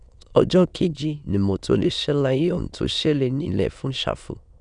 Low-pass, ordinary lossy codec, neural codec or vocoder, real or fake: 9.9 kHz; none; autoencoder, 22.05 kHz, a latent of 192 numbers a frame, VITS, trained on many speakers; fake